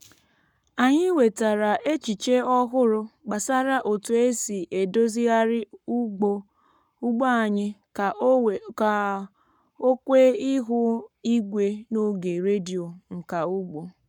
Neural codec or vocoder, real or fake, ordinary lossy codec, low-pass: codec, 44.1 kHz, 7.8 kbps, Pupu-Codec; fake; none; 19.8 kHz